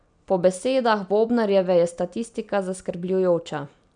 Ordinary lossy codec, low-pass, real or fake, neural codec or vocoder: none; 9.9 kHz; real; none